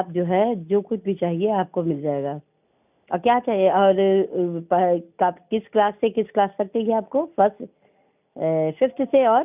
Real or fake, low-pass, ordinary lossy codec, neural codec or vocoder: real; 3.6 kHz; none; none